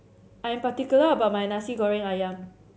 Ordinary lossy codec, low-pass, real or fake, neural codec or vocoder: none; none; real; none